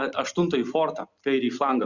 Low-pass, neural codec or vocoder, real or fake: 7.2 kHz; none; real